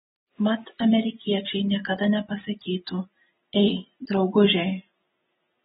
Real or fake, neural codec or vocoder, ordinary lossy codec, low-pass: real; none; AAC, 16 kbps; 19.8 kHz